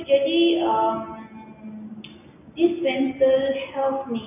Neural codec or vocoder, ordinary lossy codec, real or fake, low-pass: none; none; real; 3.6 kHz